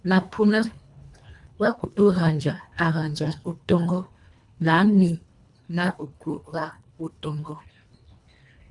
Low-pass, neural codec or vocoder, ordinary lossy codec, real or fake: 10.8 kHz; codec, 24 kHz, 1.5 kbps, HILCodec; MP3, 96 kbps; fake